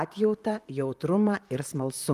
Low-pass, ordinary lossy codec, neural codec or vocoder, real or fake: 14.4 kHz; Opus, 32 kbps; vocoder, 44.1 kHz, 128 mel bands, Pupu-Vocoder; fake